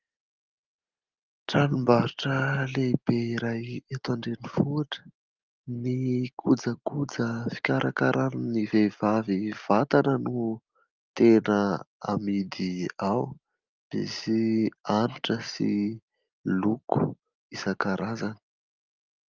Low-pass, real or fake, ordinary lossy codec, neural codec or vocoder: 7.2 kHz; real; Opus, 32 kbps; none